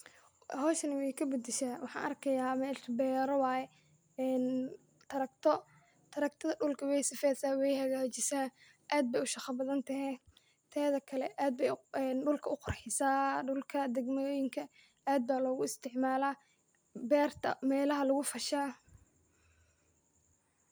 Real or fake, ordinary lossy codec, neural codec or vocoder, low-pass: real; none; none; none